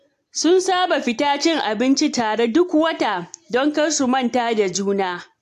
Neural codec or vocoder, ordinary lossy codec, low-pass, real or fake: none; AAC, 64 kbps; 14.4 kHz; real